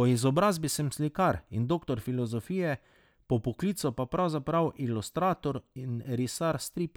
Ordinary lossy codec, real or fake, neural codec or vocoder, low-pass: none; real; none; none